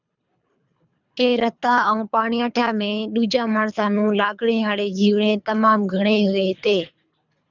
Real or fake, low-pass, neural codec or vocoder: fake; 7.2 kHz; codec, 24 kHz, 3 kbps, HILCodec